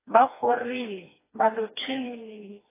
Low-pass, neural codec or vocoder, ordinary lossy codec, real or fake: 3.6 kHz; codec, 16 kHz, 2 kbps, FreqCodec, smaller model; AAC, 16 kbps; fake